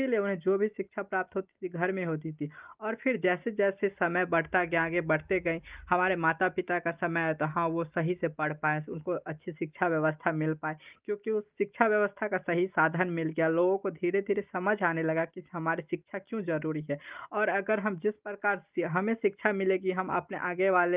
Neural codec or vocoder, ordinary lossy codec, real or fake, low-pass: none; Opus, 32 kbps; real; 3.6 kHz